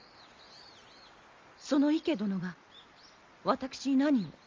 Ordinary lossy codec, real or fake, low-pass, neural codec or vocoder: Opus, 32 kbps; real; 7.2 kHz; none